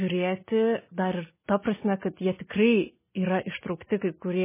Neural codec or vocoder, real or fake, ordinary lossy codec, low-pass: none; real; MP3, 16 kbps; 3.6 kHz